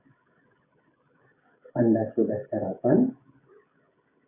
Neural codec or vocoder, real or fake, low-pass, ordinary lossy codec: codec, 16 kHz, 16 kbps, FreqCodec, smaller model; fake; 3.6 kHz; AAC, 24 kbps